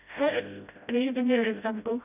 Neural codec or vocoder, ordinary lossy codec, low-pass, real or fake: codec, 16 kHz, 0.5 kbps, FreqCodec, smaller model; none; 3.6 kHz; fake